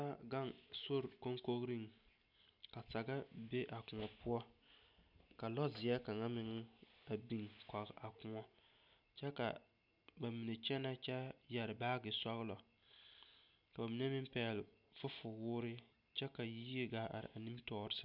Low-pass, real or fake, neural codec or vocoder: 5.4 kHz; real; none